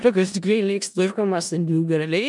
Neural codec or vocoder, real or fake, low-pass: codec, 16 kHz in and 24 kHz out, 0.4 kbps, LongCat-Audio-Codec, four codebook decoder; fake; 10.8 kHz